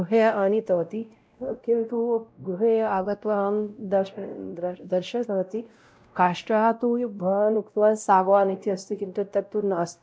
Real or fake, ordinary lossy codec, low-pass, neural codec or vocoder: fake; none; none; codec, 16 kHz, 0.5 kbps, X-Codec, WavLM features, trained on Multilingual LibriSpeech